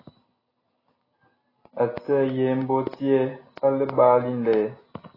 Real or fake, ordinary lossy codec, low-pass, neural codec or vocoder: real; AAC, 24 kbps; 5.4 kHz; none